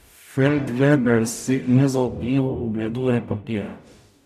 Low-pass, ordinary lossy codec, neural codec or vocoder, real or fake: 14.4 kHz; none; codec, 44.1 kHz, 0.9 kbps, DAC; fake